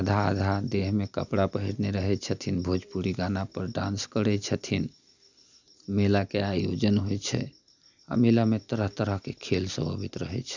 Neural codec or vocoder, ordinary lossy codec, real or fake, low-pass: none; none; real; 7.2 kHz